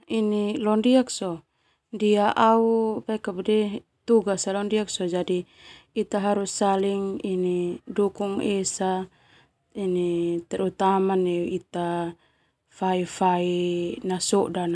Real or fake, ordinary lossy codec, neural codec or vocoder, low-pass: real; none; none; none